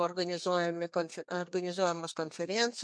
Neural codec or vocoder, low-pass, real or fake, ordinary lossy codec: codec, 32 kHz, 1.9 kbps, SNAC; 9.9 kHz; fake; MP3, 64 kbps